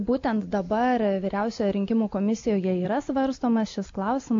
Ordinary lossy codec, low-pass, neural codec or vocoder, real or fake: AAC, 48 kbps; 7.2 kHz; none; real